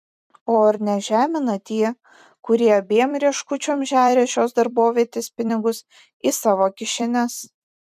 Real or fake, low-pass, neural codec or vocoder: fake; 14.4 kHz; vocoder, 44.1 kHz, 128 mel bands every 256 samples, BigVGAN v2